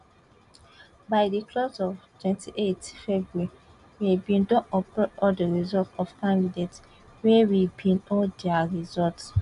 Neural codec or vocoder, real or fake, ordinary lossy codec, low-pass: none; real; none; 10.8 kHz